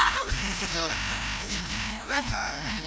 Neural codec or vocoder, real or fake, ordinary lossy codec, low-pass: codec, 16 kHz, 0.5 kbps, FreqCodec, larger model; fake; none; none